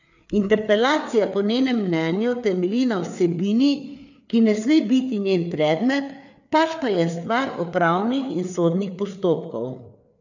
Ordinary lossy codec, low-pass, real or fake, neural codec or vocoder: none; 7.2 kHz; fake; codec, 16 kHz, 4 kbps, FreqCodec, larger model